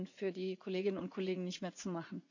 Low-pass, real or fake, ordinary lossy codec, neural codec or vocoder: 7.2 kHz; fake; none; vocoder, 44.1 kHz, 80 mel bands, Vocos